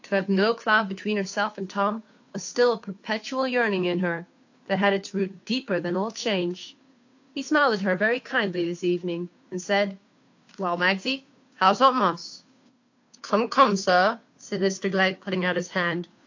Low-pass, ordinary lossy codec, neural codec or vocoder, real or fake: 7.2 kHz; AAC, 48 kbps; codec, 16 kHz, 2 kbps, FunCodec, trained on Chinese and English, 25 frames a second; fake